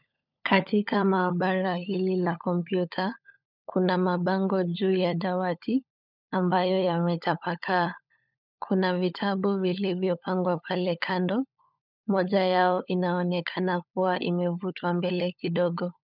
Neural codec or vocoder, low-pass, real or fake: codec, 16 kHz, 16 kbps, FunCodec, trained on LibriTTS, 50 frames a second; 5.4 kHz; fake